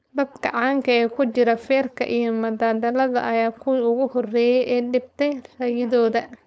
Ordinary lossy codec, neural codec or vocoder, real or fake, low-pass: none; codec, 16 kHz, 4.8 kbps, FACodec; fake; none